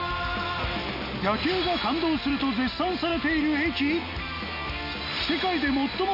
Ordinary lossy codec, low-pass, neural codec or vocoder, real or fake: none; 5.4 kHz; none; real